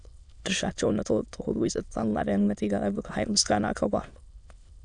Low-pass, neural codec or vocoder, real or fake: 9.9 kHz; autoencoder, 22.05 kHz, a latent of 192 numbers a frame, VITS, trained on many speakers; fake